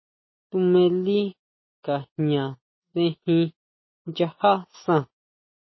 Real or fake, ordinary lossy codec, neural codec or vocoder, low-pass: real; MP3, 24 kbps; none; 7.2 kHz